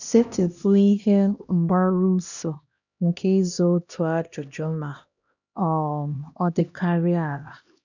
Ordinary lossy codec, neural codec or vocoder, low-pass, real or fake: none; codec, 16 kHz, 1 kbps, X-Codec, HuBERT features, trained on LibriSpeech; 7.2 kHz; fake